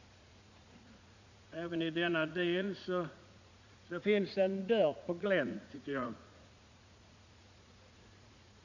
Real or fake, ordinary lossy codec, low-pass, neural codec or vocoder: fake; none; 7.2 kHz; vocoder, 44.1 kHz, 80 mel bands, Vocos